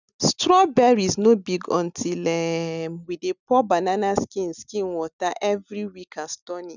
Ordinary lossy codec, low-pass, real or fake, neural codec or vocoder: none; 7.2 kHz; real; none